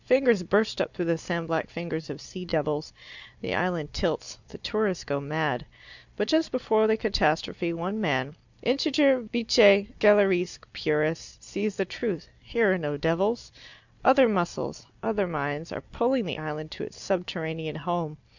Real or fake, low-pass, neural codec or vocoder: real; 7.2 kHz; none